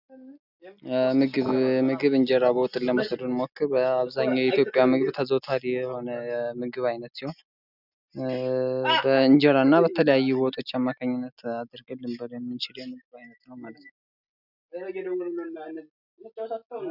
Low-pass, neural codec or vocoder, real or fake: 5.4 kHz; none; real